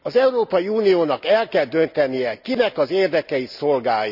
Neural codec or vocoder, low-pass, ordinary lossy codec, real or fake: none; 5.4 kHz; none; real